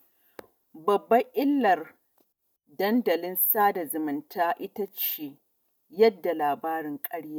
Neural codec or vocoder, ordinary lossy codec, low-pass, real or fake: none; none; none; real